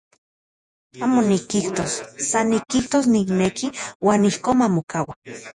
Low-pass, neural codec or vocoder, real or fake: 10.8 kHz; vocoder, 48 kHz, 128 mel bands, Vocos; fake